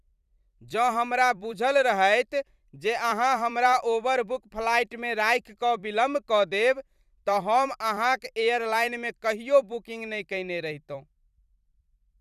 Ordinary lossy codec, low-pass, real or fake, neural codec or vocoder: none; 14.4 kHz; fake; vocoder, 44.1 kHz, 128 mel bands every 256 samples, BigVGAN v2